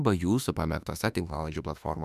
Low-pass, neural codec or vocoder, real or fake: 14.4 kHz; autoencoder, 48 kHz, 32 numbers a frame, DAC-VAE, trained on Japanese speech; fake